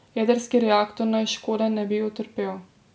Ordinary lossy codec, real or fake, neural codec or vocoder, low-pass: none; real; none; none